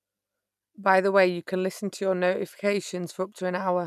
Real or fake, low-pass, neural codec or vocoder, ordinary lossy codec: real; 14.4 kHz; none; none